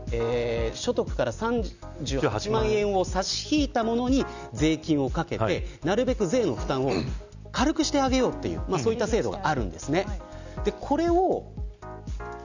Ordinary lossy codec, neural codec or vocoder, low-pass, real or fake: none; none; 7.2 kHz; real